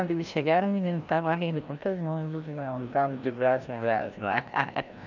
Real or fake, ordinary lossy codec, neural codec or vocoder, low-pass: fake; none; codec, 16 kHz, 1 kbps, FreqCodec, larger model; 7.2 kHz